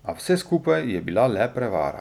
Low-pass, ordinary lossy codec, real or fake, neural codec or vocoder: 19.8 kHz; none; real; none